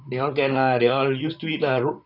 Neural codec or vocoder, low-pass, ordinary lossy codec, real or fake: vocoder, 44.1 kHz, 128 mel bands, Pupu-Vocoder; 5.4 kHz; none; fake